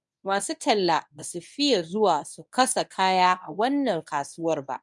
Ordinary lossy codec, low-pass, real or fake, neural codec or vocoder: none; 10.8 kHz; fake; codec, 24 kHz, 0.9 kbps, WavTokenizer, medium speech release version 1